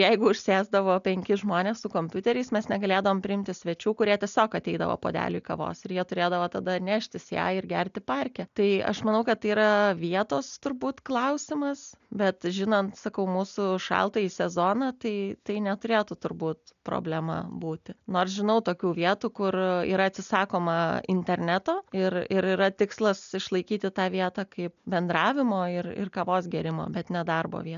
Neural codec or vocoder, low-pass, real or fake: none; 7.2 kHz; real